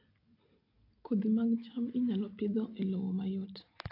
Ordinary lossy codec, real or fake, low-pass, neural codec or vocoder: AAC, 48 kbps; real; 5.4 kHz; none